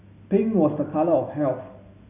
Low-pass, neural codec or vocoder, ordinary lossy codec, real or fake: 3.6 kHz; none; none; real